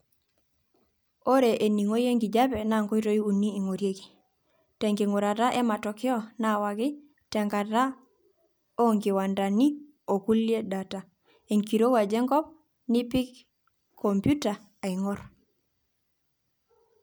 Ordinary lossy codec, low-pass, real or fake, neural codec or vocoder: none; none; real; none